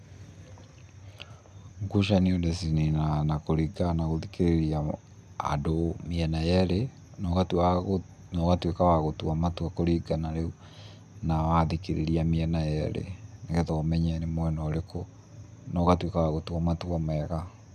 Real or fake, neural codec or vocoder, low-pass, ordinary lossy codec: real; none; 14.4 kHz; none